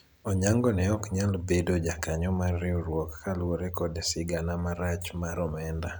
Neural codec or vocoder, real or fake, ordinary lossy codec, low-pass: none; real; none; none